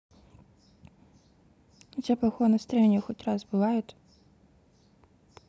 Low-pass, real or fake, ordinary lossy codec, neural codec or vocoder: none; real; none; none